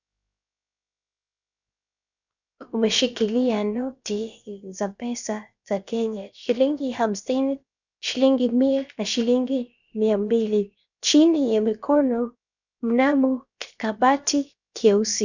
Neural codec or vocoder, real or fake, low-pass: codec, 16 kHz, 0.7 kbps, FocalCodec; fake; 7.2 kHz